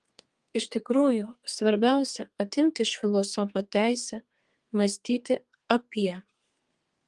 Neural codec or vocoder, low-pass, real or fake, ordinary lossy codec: codec, 32 kHz, 1.9 kbps, SNAC; 10.8 kHz; fake; Opus, 24 kbps